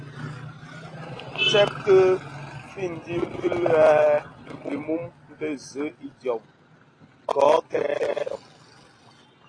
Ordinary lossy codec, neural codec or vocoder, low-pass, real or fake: AAC, 32 kbps; none; 9.9 kHz; real